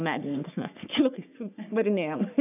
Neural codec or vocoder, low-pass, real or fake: codec, 44.1 kHz, 3.4 kbps, Pupu-Codec; 3.6 kHz; fake